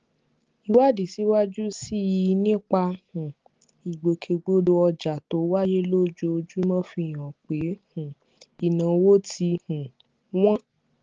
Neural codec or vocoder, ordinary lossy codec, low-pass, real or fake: none; Opus, 16 kbps; 7.2 kHz; real